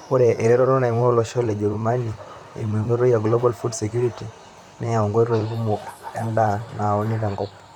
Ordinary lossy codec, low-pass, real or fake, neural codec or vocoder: none; 19.8 kHz; fake; vocoder, 44.1 kHz, 128 mel bands, Pupu-Vocoder